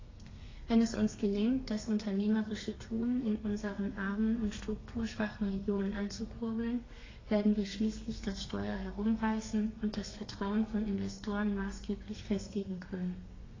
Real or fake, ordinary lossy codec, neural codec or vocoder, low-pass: fake; AAC, 32 kbps; codec, 32 kHz, 1.9 kbps, SNAC; 7.2 kHz